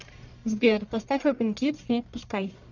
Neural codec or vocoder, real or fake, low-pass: codec, 44.1 kHz, 1.7 kbps, Pupu-Codec; fake; 7.2 kHz